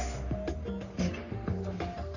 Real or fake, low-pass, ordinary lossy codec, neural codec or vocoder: fake; 7.2 kHz; none; codec, 44.1 kHz, 3.4 kbps, Pupu-Codec